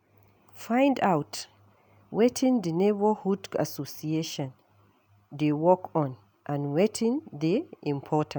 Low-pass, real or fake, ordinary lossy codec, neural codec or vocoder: none; real; none; none